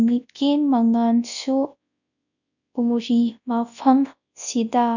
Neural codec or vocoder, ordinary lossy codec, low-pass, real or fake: codec, 24 kHz, 0.9 kbps, WavTokenizer, large speech release; none; 7.2 kHz; fake